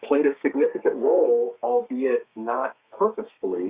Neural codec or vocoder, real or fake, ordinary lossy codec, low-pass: codec, 32 kHz, 1.9 kbps, SNAC; fake; Opus, 64 kbps; 3.6 kHz